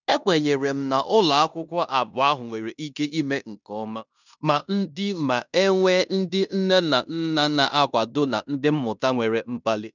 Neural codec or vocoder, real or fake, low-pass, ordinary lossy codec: codec, 16 kHz in and 24 kHz out, 0.9 kbps, LongCat-Audio-Codec, fine tuned four codebook decoder; fake; 7.2 kHz; none